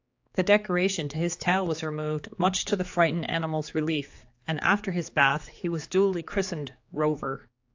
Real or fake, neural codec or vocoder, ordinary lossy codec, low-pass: fake; codec, 16 kHz, 4 kbps, X-Codec, HuBERT features, trained on general audio; AAC, 48 kbps; 7.2 kHz